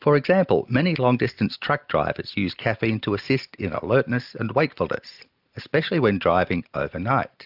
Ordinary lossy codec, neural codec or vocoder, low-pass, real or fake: AAC, 48 kbps; none; 5.4 kHz; real